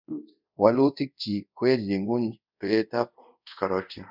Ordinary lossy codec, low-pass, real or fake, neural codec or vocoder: none; 5.4 kHz; fake; codec, 24 kHz, 0.5 kbps, DualCodec